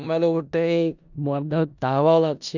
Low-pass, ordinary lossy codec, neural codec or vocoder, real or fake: 7.2 kHz; none; codec, 16 kHz in and 24 kHz out, 0.4 kbps, LongCat-Audio-Codec, four codebook decoder; fake